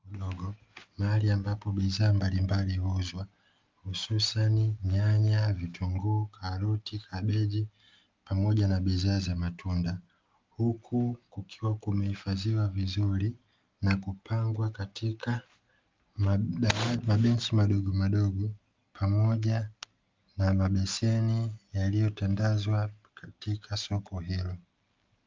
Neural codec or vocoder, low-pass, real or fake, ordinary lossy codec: none; 7.2 kHz; real; Opus, 32 kbps